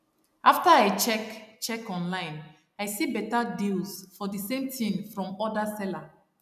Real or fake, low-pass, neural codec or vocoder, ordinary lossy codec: real; 14.4 kHz; none; none